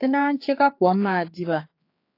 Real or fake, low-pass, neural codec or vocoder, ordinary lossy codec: fake; 5.4 kHz; codec, 16 kHz, 4 kbps, X-Codec, HuBERT features, trained on general audio; AAC, 32 kbps